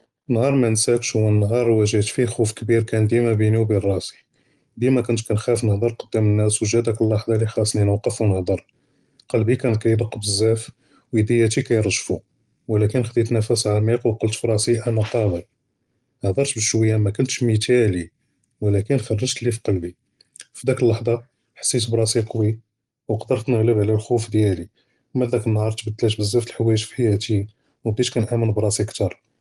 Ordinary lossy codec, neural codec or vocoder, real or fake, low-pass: Opus, 24 kbps; none; real; 10.8 kHz